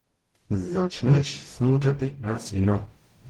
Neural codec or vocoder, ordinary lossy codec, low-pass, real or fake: codec, 44.1 kHz, 0.9 kbps, DAC; Opus, 16 kbps; 19.8 kHz; fake